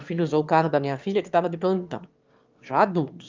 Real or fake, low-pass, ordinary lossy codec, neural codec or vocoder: fake; 7.2 kHz; Opus, 32 kbps; autoencoder, 22.05 kHz, a latent of 192 numbers a frame, VITS, trained on one speaker